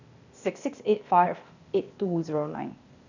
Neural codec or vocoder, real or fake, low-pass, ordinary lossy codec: codec, 16 kHz, 0.8 kbps, ZipCodec; fake; 7.2 kHz; none